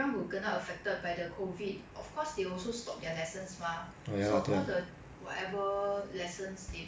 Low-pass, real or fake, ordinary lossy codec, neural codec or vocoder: none; real; none; none